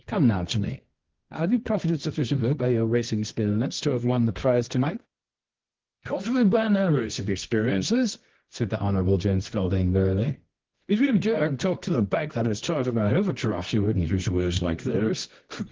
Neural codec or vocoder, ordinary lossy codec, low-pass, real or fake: codec, 24 kHz, 0.9 kbps, WavTokenizer, medium music audio release; Opus, 16 kbps; 7.2 kHz; fake